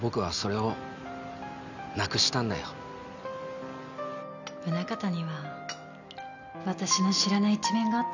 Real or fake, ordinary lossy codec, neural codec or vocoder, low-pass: real; none; none; 7.2 kHz